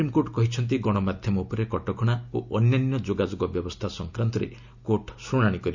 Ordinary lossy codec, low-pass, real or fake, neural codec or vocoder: none; 7.2 kHz; real; none